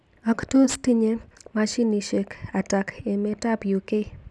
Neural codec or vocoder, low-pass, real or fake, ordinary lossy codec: none; none; real; none